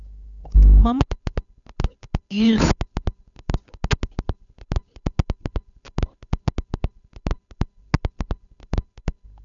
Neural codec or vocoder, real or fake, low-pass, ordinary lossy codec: codec, 16 kHz, 8 kbps, FunCodec, trained on Chinese and English, 25 frames a second; fake; 7.2 kHz; none